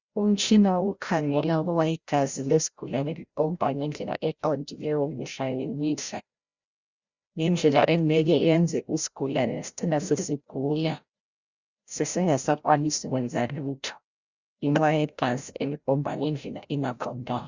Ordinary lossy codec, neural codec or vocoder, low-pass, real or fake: Opus, 64 kbps; codec, 16 kHz, 0.5 kbps, FreqCodec, larger model; 7.2 kHz; fake